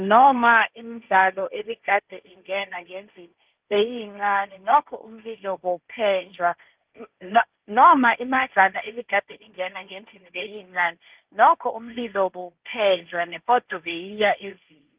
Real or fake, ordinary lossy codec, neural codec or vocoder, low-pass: fake; Opus, 32 kbps; codec, 16 kHz, 1.1 kbps, Voila-Tokenizer; 3.6 kHz